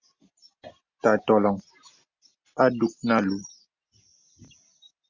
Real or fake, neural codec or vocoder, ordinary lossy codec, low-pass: real; none; Opus, 64 kbps; 7.2 kHz